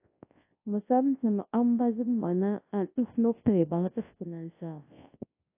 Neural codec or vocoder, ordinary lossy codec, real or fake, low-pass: codec, 24 kHz, 0.9 kbps, WavTokenizer, large speech release; AAC, 24 kbps; fake; 3.6 kHz